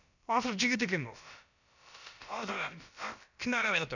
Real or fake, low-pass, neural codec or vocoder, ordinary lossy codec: fake; 7.2 kHz; codec, 16 kHz, about 1 kbps, DyCAST, with the encoder's durations; none